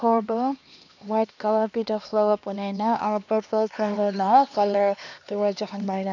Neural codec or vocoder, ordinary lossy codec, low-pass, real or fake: codec, 16 kHz, 2 kbps, X-Codec, HuBERT features, trained on LibriSpeech; none; 7.2 kHz; fake